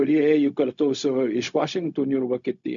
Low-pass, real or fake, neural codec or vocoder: 7.2 kHz; fake; codec, 16 kHz, 0.4 kbps, LongCat-Audio-Codec